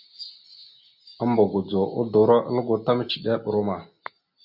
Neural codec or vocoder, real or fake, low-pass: none; real; 5.4 kHz